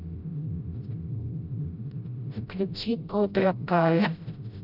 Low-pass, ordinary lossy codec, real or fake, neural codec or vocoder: 5.4 kHz; none; fake; codec, 16 kHz, 0.5 kbps, FreqCodec, smaller model